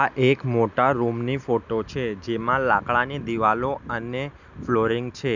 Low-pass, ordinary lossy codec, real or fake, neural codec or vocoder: 7.2 kHz; none; real; none